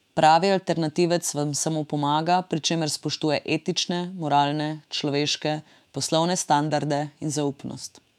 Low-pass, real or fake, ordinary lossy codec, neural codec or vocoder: 19.8 kHz; fake; none; autoencoder, 48 kHz, 128 numbers a frame, DAC-VAE, trained on Japanese speech